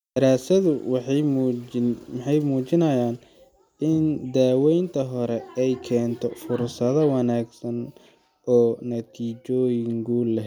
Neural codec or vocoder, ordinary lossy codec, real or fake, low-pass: none; none; real; 19.8 kHz